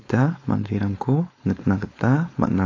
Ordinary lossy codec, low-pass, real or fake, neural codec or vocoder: AAC, 32 kbps; 7.2 kHz; fake; codec, 16 kHz, 4.8 kbps, FACodec